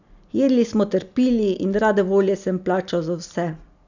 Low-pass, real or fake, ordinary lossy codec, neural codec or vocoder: 7.2 kHz; real; none; none